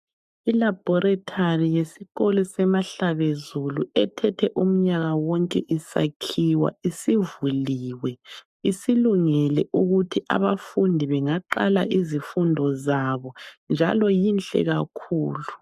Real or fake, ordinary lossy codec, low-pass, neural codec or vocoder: fake; MP3, 96 kbps; 14.4 kHz; codec, 44.1 kHz, 7.8 kbps, Pupu-Codec